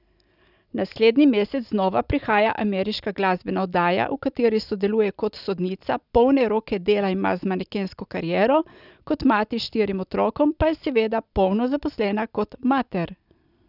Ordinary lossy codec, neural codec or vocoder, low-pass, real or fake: none; none; 5.4 kHz; real